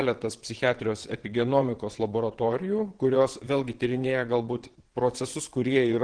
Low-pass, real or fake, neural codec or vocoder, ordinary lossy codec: 9.9 kHz; fake; vocoder, 22.05 kHz, 80 mel bands, WaveNeXt; Opus, 16 kbps